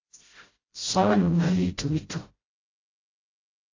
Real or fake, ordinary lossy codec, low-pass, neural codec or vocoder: fake; AAC, 32 kbps; 7.2 kHz; codec, 16 kHz, 0.5 kbps, FreqCodec, smaller model